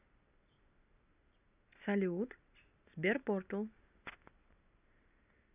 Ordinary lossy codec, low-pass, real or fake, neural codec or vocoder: none; 3.6 kHz; real; none